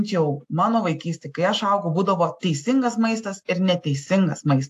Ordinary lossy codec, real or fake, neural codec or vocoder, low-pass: AAC, 64 kbps; real; none; 14.4 kHz